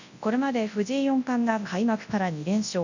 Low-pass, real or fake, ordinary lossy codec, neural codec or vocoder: 7.2 kHz; fake; none; codec, 24 kHz, 0.9 kbps, WavTokenizer, large speech release